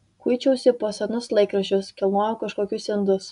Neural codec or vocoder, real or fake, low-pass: none; real; 10.8 kHz